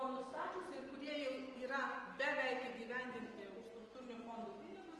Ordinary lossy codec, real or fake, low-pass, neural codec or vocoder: Opus, 24 kbps; real; 10.8 kHz; none